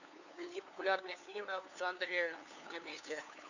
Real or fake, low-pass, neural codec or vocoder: fake; 7.2 kHz; codec, 16 kHz, 2 kbps, FunCodec, trained on LibriTTS, 25 frames a second